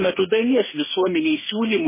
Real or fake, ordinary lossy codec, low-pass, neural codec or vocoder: fake; MP3, 16 kbps; 3.6 kHz; codec, 44.1 kHz, 2.6 kbps, DAC